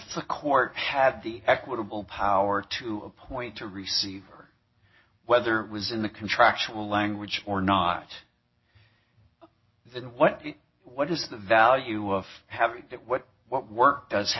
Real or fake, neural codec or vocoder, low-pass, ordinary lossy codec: real; none; 7.2 kHz; MP3, 24 kbps